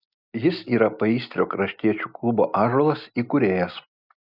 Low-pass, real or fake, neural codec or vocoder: 5.4 kHz; real; none